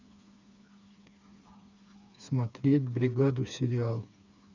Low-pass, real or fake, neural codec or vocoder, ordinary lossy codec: 7.2 kHz; fake; codec, 16 kHz, 4 kbps, FreqCodec, smaller model; none